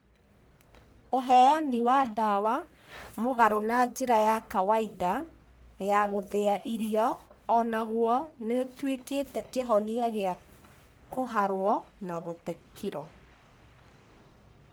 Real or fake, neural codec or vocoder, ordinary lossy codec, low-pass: fake; codec, 44.1 kHz, 1.7 kbps, Pupu-Codec; none; none